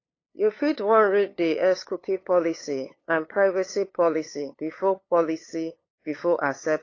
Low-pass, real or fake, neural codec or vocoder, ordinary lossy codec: 7.2 kHz; fake; codec, 16 kHz, 8 kbps, FunCodec, trained on LibriTTS, 25 frames a second; AAC, 32 kbps